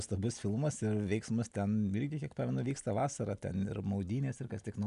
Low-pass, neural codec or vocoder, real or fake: 10.8 kHz; none; real